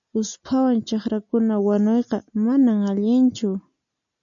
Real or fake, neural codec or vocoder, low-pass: real; none; 7.2 kHz